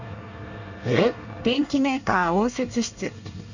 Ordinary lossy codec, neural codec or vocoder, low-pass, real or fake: none; codec, 24 kHz, 1 kbps, SNAC; 7.2 kHz; fake